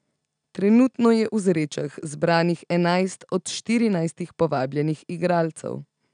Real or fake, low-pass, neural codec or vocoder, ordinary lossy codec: real; 9.9 kHz; none; none